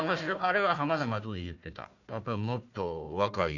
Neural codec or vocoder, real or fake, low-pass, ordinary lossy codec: codec, 16 kHz, 1 kbps, FunCodec, trained on Chinese and English, 50 frames a second; fake; 7.2 kHz; none